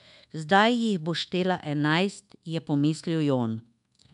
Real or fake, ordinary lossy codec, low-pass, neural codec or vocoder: fake; MP3, 96 kbps; 10.8 kHz; codec, 24 kHz, 1.2 kbps, DualCodec